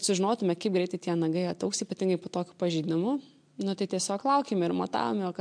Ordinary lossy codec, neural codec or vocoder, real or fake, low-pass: MP3, 64 kbps; none; real; 9.9 kHz